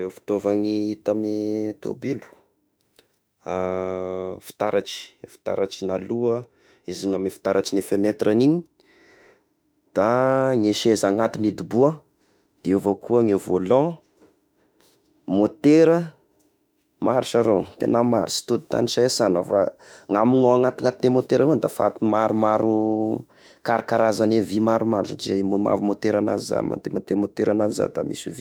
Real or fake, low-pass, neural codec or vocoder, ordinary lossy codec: fake; none; autoencoder, 48 kHz, 32 numbers a frame, DAC-VAE, trained on Japanese speech; none